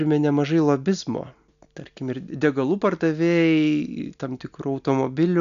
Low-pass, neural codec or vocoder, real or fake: 7.2 kHz; none; real